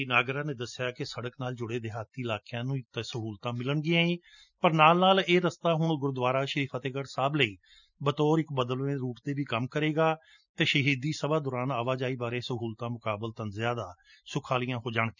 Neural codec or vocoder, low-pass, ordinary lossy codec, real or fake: none; 7.2 kHz; none; real